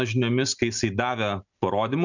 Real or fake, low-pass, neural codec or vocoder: real; 7.2 kHz; none